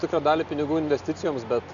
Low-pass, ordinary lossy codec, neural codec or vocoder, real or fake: 7.2 kHz; MP3, 96 kbps; none; real